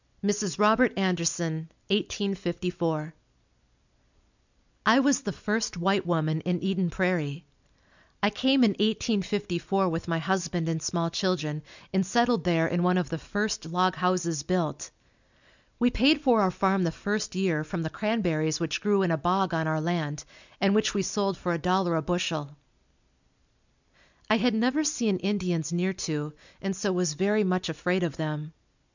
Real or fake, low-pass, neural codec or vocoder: real; 7.2 kHz; none